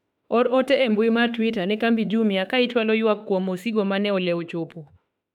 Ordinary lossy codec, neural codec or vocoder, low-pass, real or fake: none; autoencoder, 48 kHz, 32 numbers a frame, DAC-VAE, trained on Japanese speech; 19.8 kHz; fake